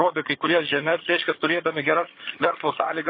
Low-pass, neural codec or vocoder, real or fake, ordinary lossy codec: 5.4 kHz; vocoder, 22.05 kHz, 80 mel bands, HiFi-GAN; fake; MP3, 24 kbps